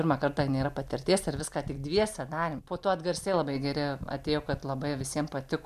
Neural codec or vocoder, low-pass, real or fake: none; 14.4 kHz; real